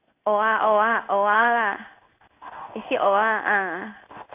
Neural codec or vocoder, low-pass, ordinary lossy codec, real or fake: codec, 16 kHz in and 24 kHz out, 1 kbps, XY-Tokenizer; 3.6 kHz; AAC, 32 kbps; fake